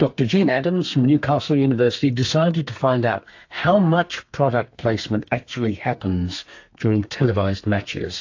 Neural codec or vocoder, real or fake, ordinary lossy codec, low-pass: codec, 32 kHz, 1.9 kbps, SNAC; fake; AAC, 48 kbps; 7.2 kHz